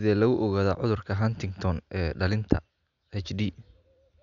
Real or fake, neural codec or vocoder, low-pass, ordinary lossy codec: real; none; 7.2 kHz; none